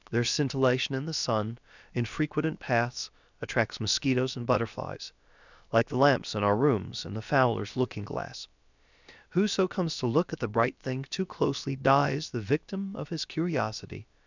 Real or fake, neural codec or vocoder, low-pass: fake; codec, 16 kHz, about 1 kbps, DyCAST, with the encoder's durations; 7.2 kHz